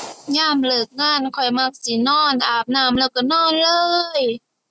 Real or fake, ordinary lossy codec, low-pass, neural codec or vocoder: real; none; none; none